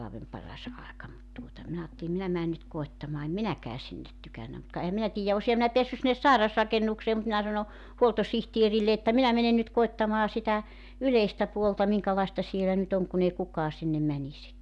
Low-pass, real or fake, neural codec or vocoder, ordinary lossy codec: none; real; none; none